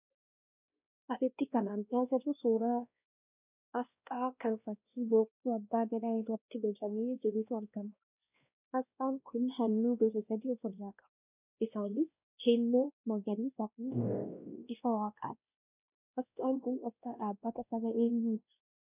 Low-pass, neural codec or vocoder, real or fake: 3.6 kHz; codec, 16 kHz, 1 kbps, X-Codec, WavLM features, trained on Multilingual LibriSpeech; fake